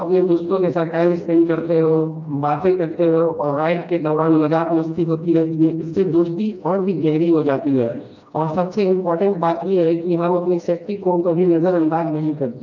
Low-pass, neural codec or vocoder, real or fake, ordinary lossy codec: 7.2 kHz; codec, 16 kHz, 1 kbps, FreqCodec, smaller model; fake; MP3, 64 kbps